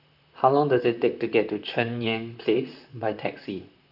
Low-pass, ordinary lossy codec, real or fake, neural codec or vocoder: 5.4 kHz; none; fake; vocoder, 44.1 kHz, 128 mel bands, Pupu-Vocoder